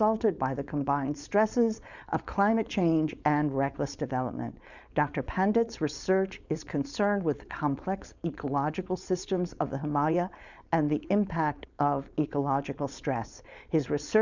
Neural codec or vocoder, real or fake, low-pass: codec, 16 kHz, 4.8 kbps, FACodec; fake; 7.2 kHz